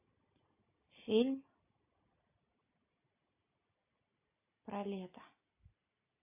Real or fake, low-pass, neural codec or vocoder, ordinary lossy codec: real; 3.6 kHz; none; AAC, 32 kbps